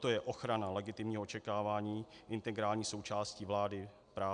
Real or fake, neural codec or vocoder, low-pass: real; none; 9.9 kHz